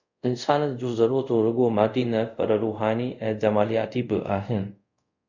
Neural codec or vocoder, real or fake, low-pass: codec, 24 kHz, 0.5 kbps, DualCodec; fake; 7.2 kHz